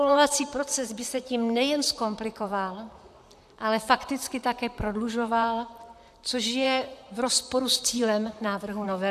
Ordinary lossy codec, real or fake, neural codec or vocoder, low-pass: AAC, 96 kbps; fake; vocoder, 44.1 kHz, 128 mel bands, Pupu-Vocoder; 14.4 kHz